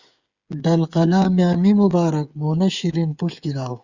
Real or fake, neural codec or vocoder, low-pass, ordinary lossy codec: fake; codec, 16 kHz, 8 kbps, FreqCodec, smaller model; 7.2 kHz; Opus, 64 kbps